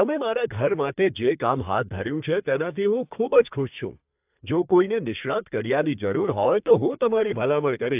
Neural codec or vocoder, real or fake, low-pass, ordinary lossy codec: codec, 32 kHz, 1.9 kbps, SNAC; fake; 3.6 kHz; AAC, 32 kbps